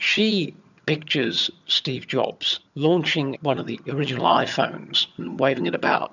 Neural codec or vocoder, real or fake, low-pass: vocoder, 22.05 kHz, 80 mel bands, HiFi-GAN; fake; 7.2 kHz